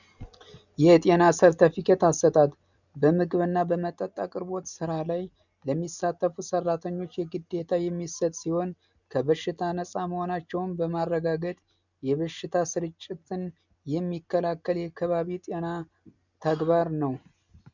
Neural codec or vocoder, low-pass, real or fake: none; 7.2 kHz; real